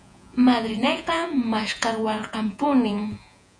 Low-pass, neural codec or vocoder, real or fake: 9.9 kHz; vocoder, 48 kHz, 128 mel bands, Vocos; fake